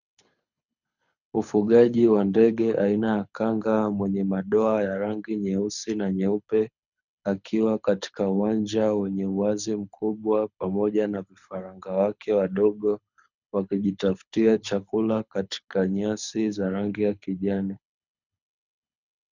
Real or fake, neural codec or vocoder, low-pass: fake; codec, 24 kHz, 6 kbps, HILCodec; 7.2 kHz